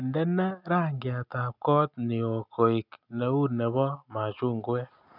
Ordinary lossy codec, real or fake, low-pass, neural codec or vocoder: none; real; 5.4 kHz; none